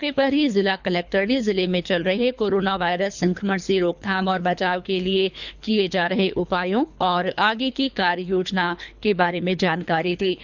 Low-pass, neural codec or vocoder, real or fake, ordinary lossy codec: 7.2 kHz; codec, 24 kHz, 3 kbps, HILCodec; fake; none